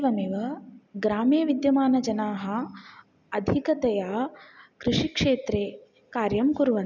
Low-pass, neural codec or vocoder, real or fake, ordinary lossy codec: 7.2 kHz; none; real; none